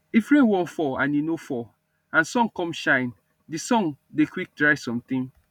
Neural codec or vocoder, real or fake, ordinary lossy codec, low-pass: none; real; none; 19.8 kHz